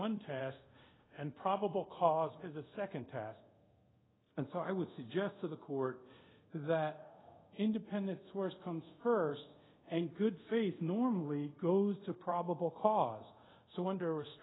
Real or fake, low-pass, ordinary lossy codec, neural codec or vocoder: fake; 7.2 kHz; AAC, 16 kbps; codec, 24 kHz, 0.5 kbps, DualCodec